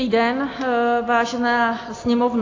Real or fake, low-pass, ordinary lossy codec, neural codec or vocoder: real; 7.2 kHz; AAC, 32 kbps; none